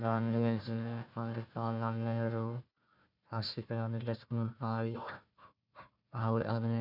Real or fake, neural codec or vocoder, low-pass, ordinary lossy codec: fake; codec, 16 kHz, 1 kbps, FunCodec, trained on Chinese and English, 50 frames a second; 5.4 kHz; none